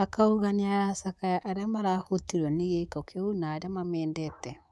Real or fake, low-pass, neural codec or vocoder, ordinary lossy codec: fake; none; codec, 24 kHz, 3.1 kbps, DualCodec; none